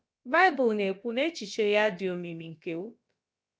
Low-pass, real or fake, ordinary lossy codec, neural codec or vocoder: none; fake; none; codec, 16 kHz, about 1 kbps, DyCAST, with the encoder's durations